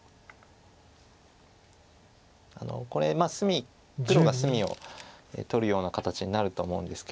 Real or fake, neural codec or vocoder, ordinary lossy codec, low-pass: real; none; none; none